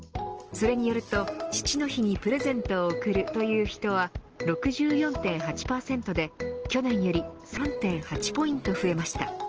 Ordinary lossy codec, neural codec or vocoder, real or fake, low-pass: Opus, 16 kbps; none; real; 7.2 kHz